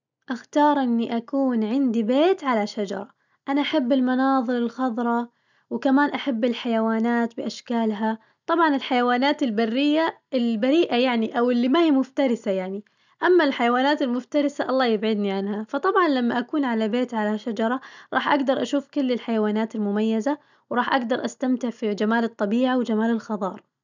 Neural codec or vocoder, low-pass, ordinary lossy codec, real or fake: none; 7.2 kHz; none; real